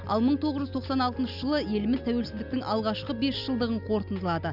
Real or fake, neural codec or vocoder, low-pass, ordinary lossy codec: real; none; 5.4 kHz; none